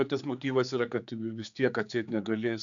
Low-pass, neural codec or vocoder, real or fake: 7.2 kHz; codec, 16 kHz, 4 kbps, X-Codec, HuBERT features, trained on general audio; fake